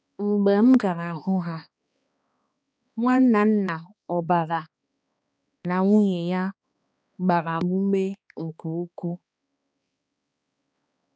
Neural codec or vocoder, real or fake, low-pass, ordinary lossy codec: codec, 16 kHz, 2 kbps, X-Codec, HuBERT features, trained on balanced general audio; fake; none; none